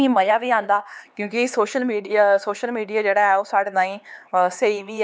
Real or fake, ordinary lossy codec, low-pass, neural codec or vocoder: fake; none; none; codec, 16 kHz, 4 kbps, X-Codec, HuBERT features, trained on LibriSpeech